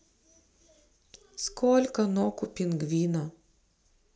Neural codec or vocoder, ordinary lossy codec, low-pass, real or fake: none; none; none; real